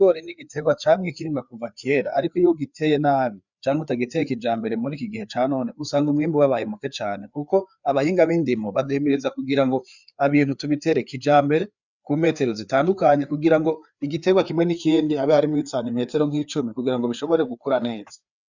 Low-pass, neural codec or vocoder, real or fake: 7.2 kHz; codec, 16 kHz, 4 kbps, FreqCodec, larger model; fake